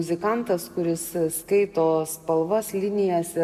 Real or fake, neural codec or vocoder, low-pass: real; none; 14.4 kHz